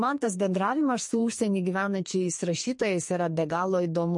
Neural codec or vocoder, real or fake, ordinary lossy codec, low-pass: codec, 44.1 kHz, 2.6 kbps, SNAC; fake; MP3, 48 kbps; 10.8 kHz